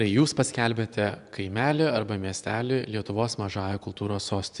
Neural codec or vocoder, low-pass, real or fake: vocoder, 24 kHz, 100 mel bands, Vocos; 10.8 kHz; fake